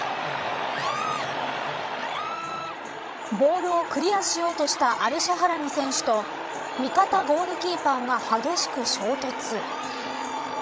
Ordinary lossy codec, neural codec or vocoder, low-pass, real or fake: none; codec, 16 kHz, 16 kbps, FreqCodec, larger model; none; fake